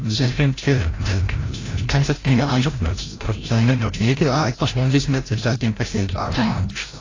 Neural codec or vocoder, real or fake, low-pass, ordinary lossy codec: codec, 16 kHz, 0.5 kbps, FreqCodec, larger model; fake; 7.2 kHz; AAC, 32 kbps